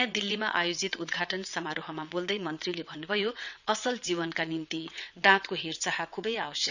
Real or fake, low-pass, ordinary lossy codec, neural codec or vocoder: fake; 7.2 kHz; none; vocoder, 22.05 kHz, 80 mel bands, WaveNeXt